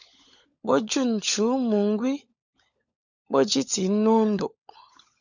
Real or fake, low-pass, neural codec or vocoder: fake; 7.2 kHz; codec, 16 kHz, 16 kbps, FunCodec, trained on LibriTTS, 50 frames a second